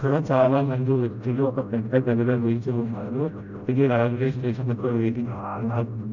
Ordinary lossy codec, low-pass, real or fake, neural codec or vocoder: none; 7.2 kHz; fake; codec, 16 kHz, 0.5 kbps, FreqCodec, smaller model